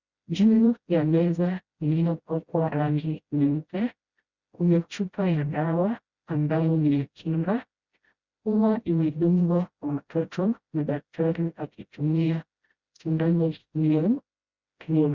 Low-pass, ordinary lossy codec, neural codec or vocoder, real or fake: 7.2 kHz; Opus, 64 kbps; codec, 16 kHz, 0.5 kbps, FreqCodec, smaller model; fake